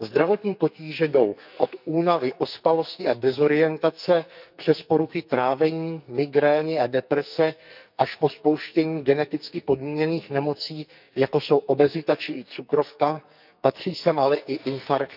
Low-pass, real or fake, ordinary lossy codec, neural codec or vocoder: 5.4 kHz; fake; none; codec, 44.1 kHz, 2.6 kbps, SNAC